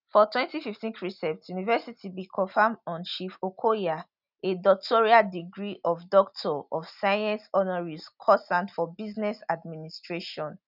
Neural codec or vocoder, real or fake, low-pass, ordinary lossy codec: none; real; 5.4 kHz; none